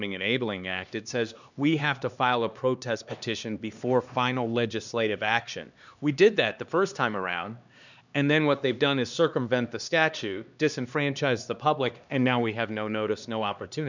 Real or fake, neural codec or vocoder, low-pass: fake; codec, 16 kHz, 2 kbps, X-Codec, HuBERT features, trained on LibriSpeech; 7.2 kHz